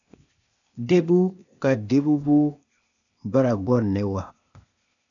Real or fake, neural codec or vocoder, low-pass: fake; codec, 16 kHz, 0.8 kbps, ZipCodec; 7.2 kHz